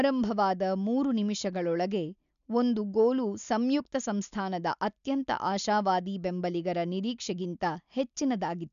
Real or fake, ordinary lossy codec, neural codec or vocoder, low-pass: real; MP3, 96 kbps; none; 7.2 kHz